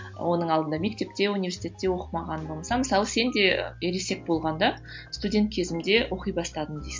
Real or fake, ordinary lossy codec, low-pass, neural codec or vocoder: real; MP3, 48 kbps; 7.2 kHz; none